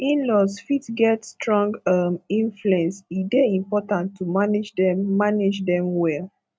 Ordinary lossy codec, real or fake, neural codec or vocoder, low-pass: none; real; none; none